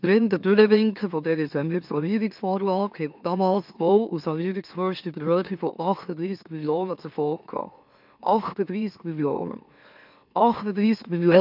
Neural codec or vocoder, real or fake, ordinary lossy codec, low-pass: autoencoder, 44.1 kHz, a latent of 192 numbers a frame, MeloTTS; fake; MP3, 48 kbps; 5.4 kHz